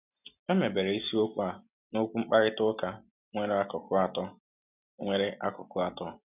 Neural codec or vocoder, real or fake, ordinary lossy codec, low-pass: none; real; none; 3.6 kHz